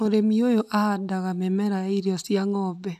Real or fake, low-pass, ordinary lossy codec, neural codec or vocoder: real; 14.4 kHz; none; none